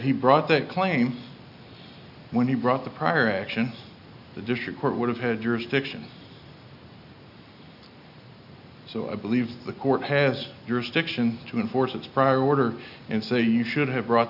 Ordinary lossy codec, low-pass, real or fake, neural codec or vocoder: AAC, 48 kbps; 5.4 kHz; real; none